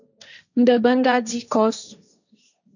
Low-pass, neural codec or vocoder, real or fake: 7.2 kHz; codec, 16 kHz, 1.1 kbps, Voila-Tokenizer; fake